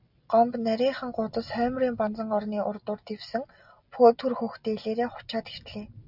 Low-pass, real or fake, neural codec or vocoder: 5.4 kHz; real; none